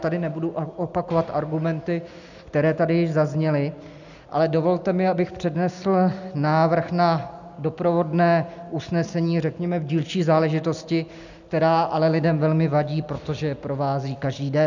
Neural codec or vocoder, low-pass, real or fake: none; 7.2 kHz; real